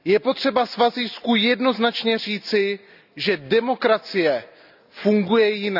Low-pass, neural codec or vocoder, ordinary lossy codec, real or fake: 5.4 kHz; none; none; real